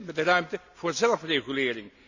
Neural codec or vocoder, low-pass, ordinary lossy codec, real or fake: none; 7.2 kHz; none; real